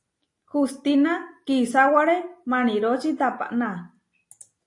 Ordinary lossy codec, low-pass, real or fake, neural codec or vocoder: AAC, 48 kbps; 10.8 kHz; real; none